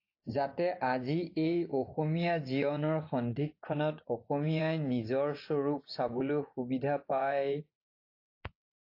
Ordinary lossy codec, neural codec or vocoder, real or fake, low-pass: AAC, 32 kbps; vocoder, 24 kHz, 100 mel bands, Vocos; fake; 5.4 kHz